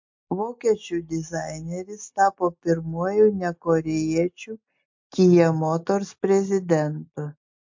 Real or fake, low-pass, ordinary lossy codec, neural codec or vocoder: real; 7.2 kHz; MP3, 48 kbps; none